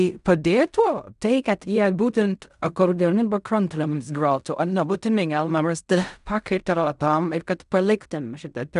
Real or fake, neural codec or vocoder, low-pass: fake; codec, 16 kHz in and 24 kHz out, 0.4 kbps, LongCat-Audio-Codec, fine tuned four codebook decoder; 10.8 kHz